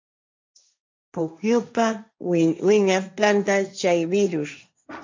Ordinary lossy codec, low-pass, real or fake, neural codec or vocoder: MP3, 64 kbps; 7.2 kHz; fake; codec, 16 kHz, 1.1 kbps, Voila-Tokenizer